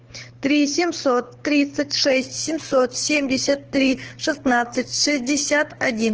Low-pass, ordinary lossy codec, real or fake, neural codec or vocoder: 7.2 kHz; Opus, 16 kbps; fake; codec, 24 kHz, 6 kbps, HILCodec